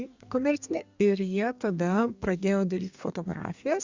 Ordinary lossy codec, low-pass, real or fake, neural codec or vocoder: Opus, 64 kbps; 7.2 kHz; fake; codec, 32 kHz, 1.9 kbps, SNAC